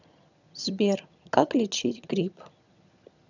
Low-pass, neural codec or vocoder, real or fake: 7.2 kHz; vocoder, 22.05 kHz, 80 mel bands, HiFi-GAN; fake